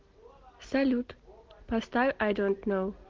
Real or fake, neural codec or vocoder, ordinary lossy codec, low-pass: real; none; Opus, 16 kbps; 7.2 kHz